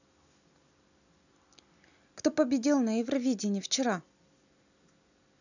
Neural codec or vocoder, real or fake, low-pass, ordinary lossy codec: none; real; 7.2 kHz; none